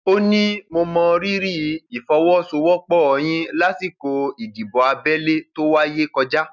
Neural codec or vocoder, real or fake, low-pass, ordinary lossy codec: none; real; 7.2 kHz; none